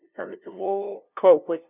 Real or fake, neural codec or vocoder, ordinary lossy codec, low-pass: fake; codec, 16 kHz, 0.5 kbps, FunCodec, trained on LibriTTS, 25 frames a second; none; 3.6 kHz